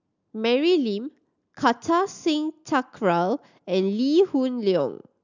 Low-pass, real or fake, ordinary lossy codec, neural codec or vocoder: 7.2 kHz; real; none; none